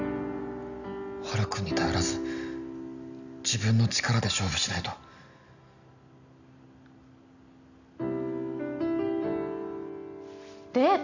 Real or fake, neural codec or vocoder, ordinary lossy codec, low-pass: real; none; none; 7.2 kHz